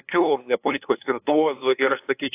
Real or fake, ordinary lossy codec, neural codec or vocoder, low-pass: fake; AAC, 24 kbps; codec, 16 kHz, 2 kbps, FunCodec, trained on LibriTTS, 25 frames a second; 3.6 kHz